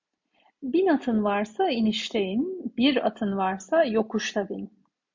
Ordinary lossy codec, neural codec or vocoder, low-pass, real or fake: MP3, 48 kbps; none; 7.2 kHz; real